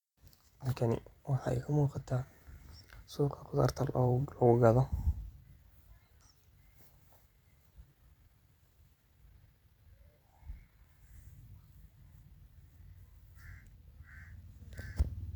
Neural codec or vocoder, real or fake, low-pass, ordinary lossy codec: vocoder, 44.1 kHz, 128 mel bands every 256 samples, BigVGAN v2; fake; 19.8 kHz; none